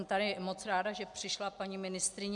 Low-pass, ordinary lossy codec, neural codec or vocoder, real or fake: 10.8 kHz; MP3, 96 kbps; none; real